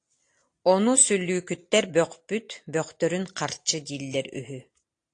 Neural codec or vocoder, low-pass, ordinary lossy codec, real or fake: none; 9.9 kHz; AAC, 48 kbps; real